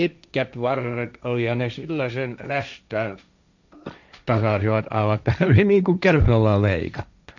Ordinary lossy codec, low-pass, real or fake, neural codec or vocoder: none; 7.2 kHz; fake; codec, 16 kHz, 1.1 kbps, Voila-Tokenizer